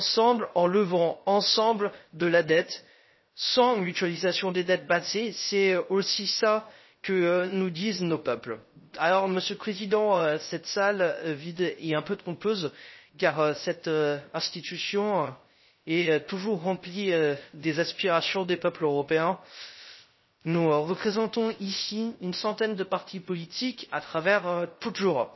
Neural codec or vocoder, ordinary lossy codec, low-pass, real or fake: codec, 16 kHz, 0.3 kbps, FocalCodec; MP3, 24 kbps; 7.2 kHz; fake